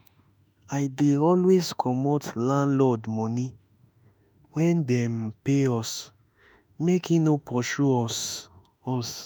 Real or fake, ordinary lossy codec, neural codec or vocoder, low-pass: fake; none; autoencoder, 48 kHz, 32 numbers a frame, DAC-VAE, trained on Japanese speech; none